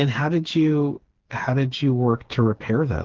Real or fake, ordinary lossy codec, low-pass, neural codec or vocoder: fake; Opus, 16 kbps; 7.2 kHz; codec, 16 kHz, 4 kbps, FreqCodec, smaller model